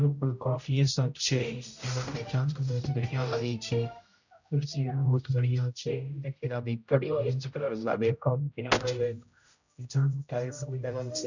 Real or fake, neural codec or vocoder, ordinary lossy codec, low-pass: fake; codec, 16 kHz, 0.5 kbps, X-Codec, HuBERT features, trained on general audio; none; 7.2 kHz